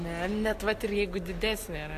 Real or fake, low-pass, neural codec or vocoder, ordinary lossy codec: real; 14.4 kHz; none; AAC, 64 kbps